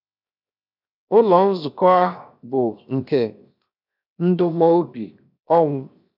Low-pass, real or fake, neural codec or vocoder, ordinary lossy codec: 5.4 kHz; fake; codec, 16 kHz, 0.7 kbps, FocalCodec; none